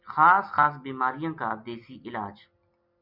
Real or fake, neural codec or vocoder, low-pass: real; none; 5.4 kHz